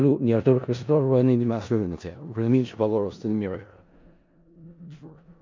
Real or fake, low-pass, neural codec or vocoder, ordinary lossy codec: fake; 7.2 kHz; codec, 16 kHz in and 24 kHz out, 0.4 kbps, LongCat-Audio-Codec, four codebook decoder; MP3, 48 kbps